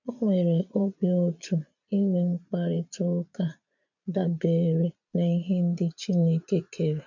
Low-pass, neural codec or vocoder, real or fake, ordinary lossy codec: 7.2 kHz; vocoder, 22.05 kHz, 80 mel bands, Vocos; fake; none